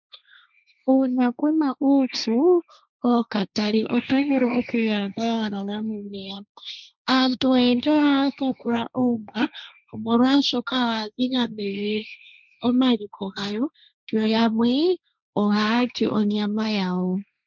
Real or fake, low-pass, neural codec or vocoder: fake; 7.2 kHz; codec, 16 kHz, 1.1 kbps, Voila-Tokenizer